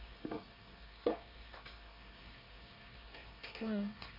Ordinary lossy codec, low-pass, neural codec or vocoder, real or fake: none; 5.4 kHz; codec, 24 kHz, 1 kbps, SNAC; fake